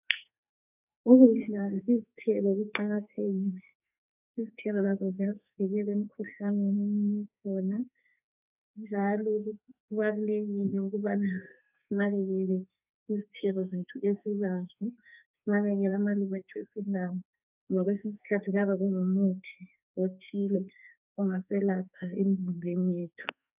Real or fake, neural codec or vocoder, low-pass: fake; codec, 44.1 kHz, 2.6 kbps, SNAC; 3.6 kHz